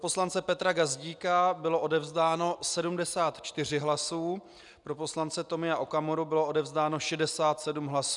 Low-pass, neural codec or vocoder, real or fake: 10.8 kHz; none; real